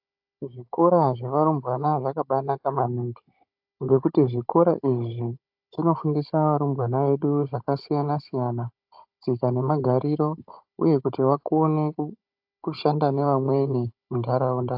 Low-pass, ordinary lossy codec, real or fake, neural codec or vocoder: 5.4 kHz; AAC, 48 kbps; fake; codec, 16 kHz, 16 kbps, FunCodec, trained on Chinese and English, 50 frames a second